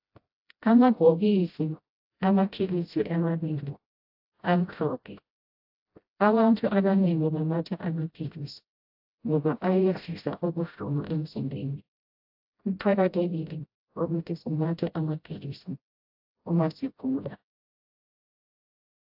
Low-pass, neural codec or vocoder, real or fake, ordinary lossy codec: 5.4 kHz; codec, 16 kHz, 0.5 kbps, FreqCodec, smaller model; fake; AAC, 48 kbps